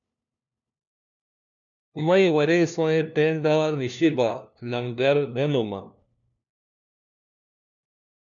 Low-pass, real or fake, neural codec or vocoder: 7.2 kHz; fake; codec, 16 kHz, 1 kbps, FunCodec, trained on LibriTTS, 50 frames a second